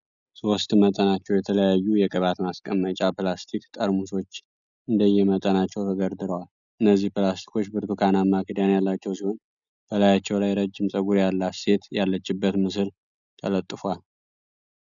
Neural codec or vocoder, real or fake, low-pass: none; real; 7.2 kHz